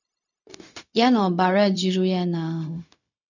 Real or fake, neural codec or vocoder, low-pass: fake; codec, 16 kHz, 0.4 kbps, LongCat-Audio-Codec; 7.2 kHz